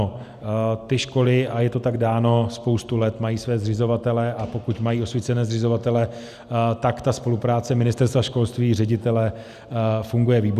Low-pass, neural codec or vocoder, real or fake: 14.4 kHz; none; real